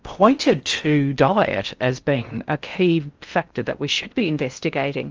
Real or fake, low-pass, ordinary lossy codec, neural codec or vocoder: fake; 7.2 kHz; Opus, 24 kbps; codec, 16 kHz in and 24 kHz out, 0.6 kbps, FocalCodec, streaming, 4096 codes